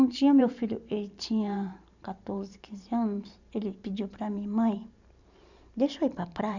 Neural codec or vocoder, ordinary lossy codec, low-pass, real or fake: vocoder, 44.1 kHz, 128 mel bands every 512 samples, BigVGAN v2; none; 7.2 kHz; fake